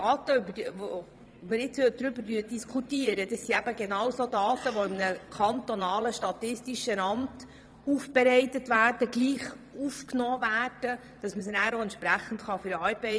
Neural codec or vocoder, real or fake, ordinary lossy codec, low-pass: vocoder, 22.05 kHz, 80 mel bands, Vocos; fake; none; none